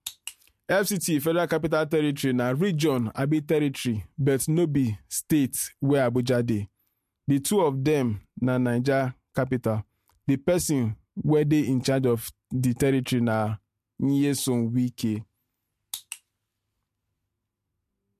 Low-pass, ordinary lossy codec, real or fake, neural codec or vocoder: 14.4 kHz; MP3, 64 kbps; real; none